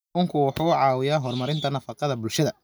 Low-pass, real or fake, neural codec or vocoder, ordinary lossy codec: none; real; none; none